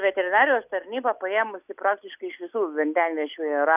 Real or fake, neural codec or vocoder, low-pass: real; none; 3.6 kHz